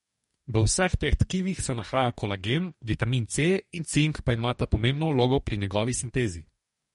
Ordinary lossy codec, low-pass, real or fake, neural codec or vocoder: MP3, 48 kbps; 19.8 kHz; fake; codec, 44.1 kHz, 2.6 kbps, DAC